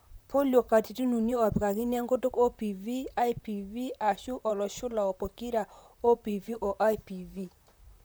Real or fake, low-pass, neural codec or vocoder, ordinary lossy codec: fake; none; vocoder, 44.1 kHz, 128 mel bands, Pupu-Vocoder; none